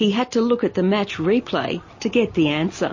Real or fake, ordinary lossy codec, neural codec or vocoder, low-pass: real; MP3, 32 kbps; none; 7.2 kHz